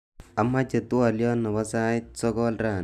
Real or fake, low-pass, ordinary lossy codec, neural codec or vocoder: real; 14.4 kHz; none; none